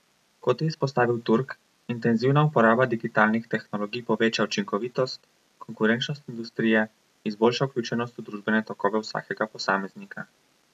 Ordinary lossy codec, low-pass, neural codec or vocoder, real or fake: none; 14.4 kHz; none; real